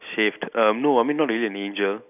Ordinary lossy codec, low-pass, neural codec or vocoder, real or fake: none; 3.6 kHz; none; real